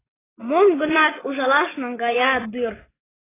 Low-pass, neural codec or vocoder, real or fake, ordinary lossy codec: 3.6 kHz; vocoder, 24 kHz, 100 mel bands, Vocos; fake; AAC, 16 kbps